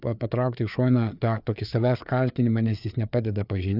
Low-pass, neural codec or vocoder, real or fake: 5.4 kHz; codec, 16 kHz, 4 kbps, FreqCodec, larger model; fake